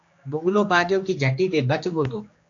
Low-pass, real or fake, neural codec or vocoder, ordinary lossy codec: 7.2 kHz; fake; codec, 16 kHz, 2 kbps, X-Codec, HuBERT features, trained on general audio; AAC, 48 kbps